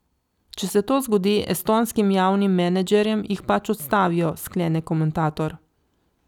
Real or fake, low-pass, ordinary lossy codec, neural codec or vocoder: real; 19.8 kHz; none; none